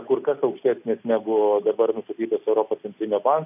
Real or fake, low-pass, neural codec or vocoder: real; 3.6 kHz; none